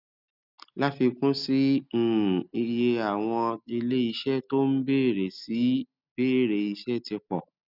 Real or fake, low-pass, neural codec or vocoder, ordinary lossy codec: real; 5.4 kHz; none; none